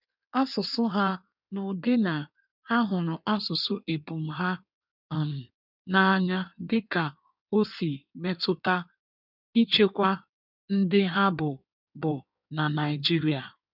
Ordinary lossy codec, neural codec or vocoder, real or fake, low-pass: none; codec, 16 kHz in and 24 kHz out, 1.1 kbps, FireRedTTS-2 codec; fake; 5.4 kHz